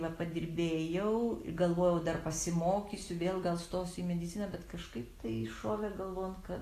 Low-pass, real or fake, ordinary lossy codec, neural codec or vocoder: 14.4 kHz; real; AAC, 48 kbps; none